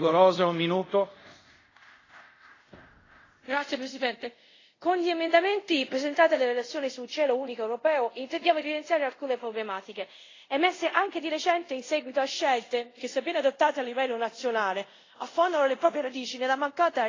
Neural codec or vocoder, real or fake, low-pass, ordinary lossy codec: codec, 24 kHz, 0.5 kbps, DualCodec; fake; 7.2 kHz; AAC, 32 kbps